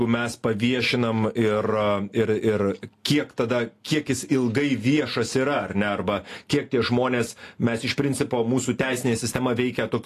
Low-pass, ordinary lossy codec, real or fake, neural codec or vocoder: 14.4 kHz; AAC, 48 kbps; real; none